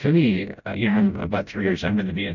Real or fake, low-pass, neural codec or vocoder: fake; 7.2 kHz; codec, 16 kHz, 0.5 kbps, FreqCodec, smaller model